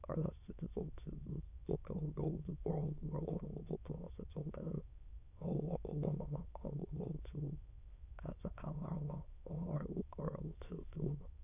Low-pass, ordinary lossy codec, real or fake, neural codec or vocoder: 3.6 kHz; none; fake; autoencoder, 22.05 kHz, a latent of 192 numbers a frame, VITS, trained on many speakers